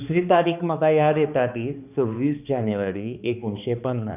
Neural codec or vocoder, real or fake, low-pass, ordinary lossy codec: codec, 16 kHz, 2 kbps, X-Codec, HuBERT features, trained on balanced general audio; fake; 3.6 kHz; none